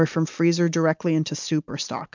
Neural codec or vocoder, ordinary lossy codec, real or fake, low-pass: none; MP3, 64 kbps; real; 7.2 kHz